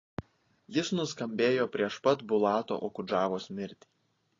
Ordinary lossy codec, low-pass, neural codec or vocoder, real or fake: AAC, 32 kbps; 7.2 kHz; none; real